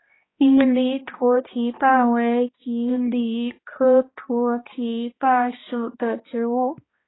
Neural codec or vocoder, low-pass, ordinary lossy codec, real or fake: codec, 16 kHz, 1 kbps, X-Codec, HuBERT features, trained on balanced general audio; 7.2 kHz; AAC, 16 kbps; fake